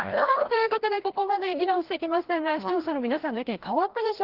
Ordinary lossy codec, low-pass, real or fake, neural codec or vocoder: Opus, 16 kbps; 5.4 kHz; fake; codec, 16 kHz, 1 kbps, FreqCodec, larger model